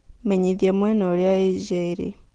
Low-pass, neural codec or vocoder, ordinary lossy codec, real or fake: 9.9 kHz; none; Opus, 16 kbps; real